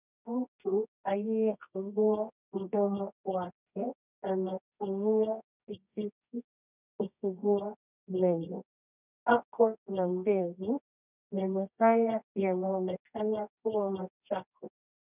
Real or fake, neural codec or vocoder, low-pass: fake; codec, 24 kHz, 0.9 kbps, WavTokenizer, medium music audio release; 3.6 kHz